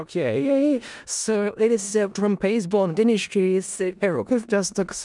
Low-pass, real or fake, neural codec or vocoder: 10.8 kHz; fake; codec, 16 kHz in and 24 kHz out, 0.4 kbps, LongCat-Audio-Codec, four codebook decoder